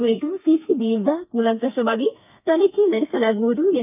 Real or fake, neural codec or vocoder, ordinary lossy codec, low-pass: fake; codec, 32 kHz, 1.9 kbps, SNAC; none; 3.6 kHz